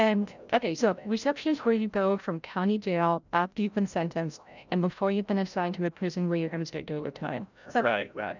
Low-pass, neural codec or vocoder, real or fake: 7.2 kHz; codec, 16 kHz, 0.5 kbps, FreqCodec, larger model; fake